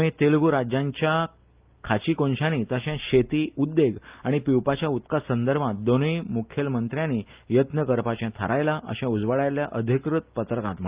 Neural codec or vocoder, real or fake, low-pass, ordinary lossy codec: none; real; 3.6 kHz; Opus, 32 kbps